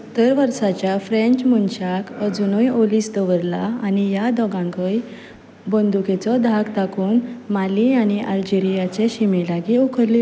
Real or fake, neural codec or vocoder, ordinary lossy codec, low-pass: real; none; none; none